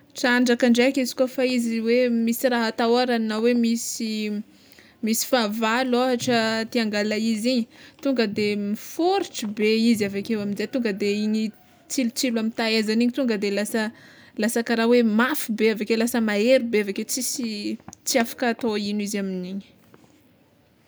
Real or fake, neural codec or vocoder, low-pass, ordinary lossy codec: real; none; none; none